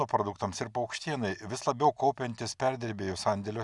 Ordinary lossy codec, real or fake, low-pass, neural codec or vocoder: Opus, 64 kbps; real; 10.8 kHz; none